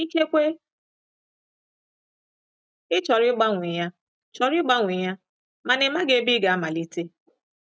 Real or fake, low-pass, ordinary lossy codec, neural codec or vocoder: real; none; none; none